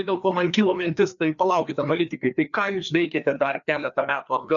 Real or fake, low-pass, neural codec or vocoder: fake; 7.2 kHz; codec, 16 kHz, 2 kbps, FreqCodec, larger model